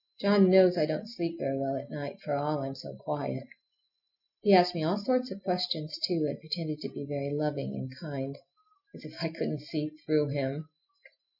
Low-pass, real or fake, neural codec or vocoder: 5.4 kHz; real; none